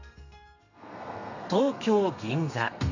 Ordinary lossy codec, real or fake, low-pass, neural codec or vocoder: none; fake; 7.2 kHz; codec, 32 kHz, 1.9 kbps, SNAC